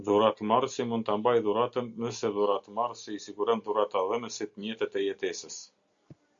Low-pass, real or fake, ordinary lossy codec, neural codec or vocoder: 7.2 kHz; real; Opus, 64 kbps; none